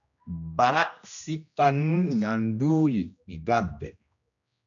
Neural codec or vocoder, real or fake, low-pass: codec, 16 kHz, 1 kbps, X-Codec, HuBERT features, trained on general audio; fake; 7.2 kHz